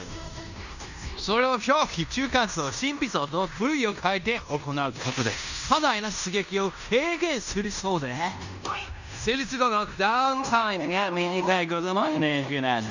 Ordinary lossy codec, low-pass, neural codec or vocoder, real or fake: none; 7.2 kHz; codec, 16 kHz in and 24 kHz out, 0.9 kbps, LongCat-Audio-Codec, fine tuned four codebook decoder; fake